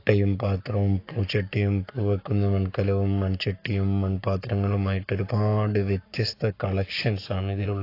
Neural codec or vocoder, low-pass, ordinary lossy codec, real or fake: codec, 44.1 kHz, 7.8 kbps, Pupu-Codec; 5.4 kHz; AAC, 32 kbps; fake